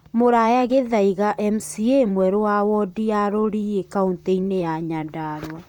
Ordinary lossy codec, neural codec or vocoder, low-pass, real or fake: none; vocoder, 44.1 kHz, 128 mel bands, Pupu-Vocoder; 19.8 kHz; fake